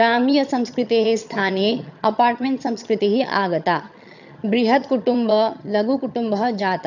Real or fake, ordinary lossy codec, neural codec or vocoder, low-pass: fake; none; vocoder, 22.05 kHz, 80 mel bands, HiFi-GAN; 7.2 kHz